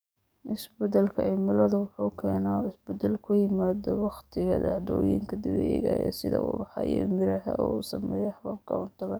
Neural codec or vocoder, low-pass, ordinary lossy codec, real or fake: codec, 44.1 kHz, 7.8 kbps, DAC; none; none; fake